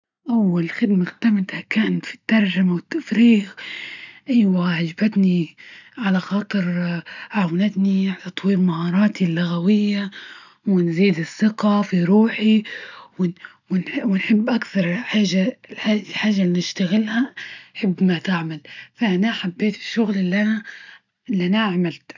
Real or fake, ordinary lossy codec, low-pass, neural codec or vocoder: real; none; 7.2 kHz; none